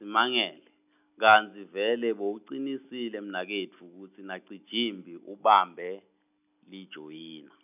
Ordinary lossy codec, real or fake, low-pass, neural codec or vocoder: none; real; 3.6 kHz; none